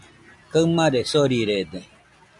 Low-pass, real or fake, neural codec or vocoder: 10.8 kHz; real; none